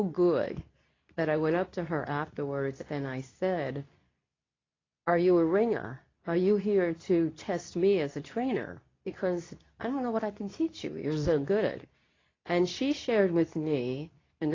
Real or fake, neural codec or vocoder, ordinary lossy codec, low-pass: fake; codec, 24 kHz, 0.9 kbps, WavTokenizer, medium speech release version 2; AAC, 32 kbps; 7.2 kHz